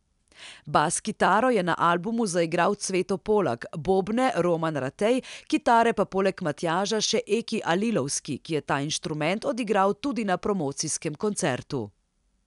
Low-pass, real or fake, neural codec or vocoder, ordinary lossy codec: 10.8 kHz; real; none; none